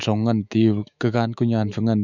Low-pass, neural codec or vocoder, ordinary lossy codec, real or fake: 7.2 kHz; autoencoder, 48 kHz, 128 numbers a frame, DAC-VAE, trained on Japanese speech; none; fake